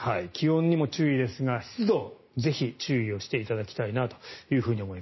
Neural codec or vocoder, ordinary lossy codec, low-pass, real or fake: none; MP3, 24 kbps; 7.2 kHz; real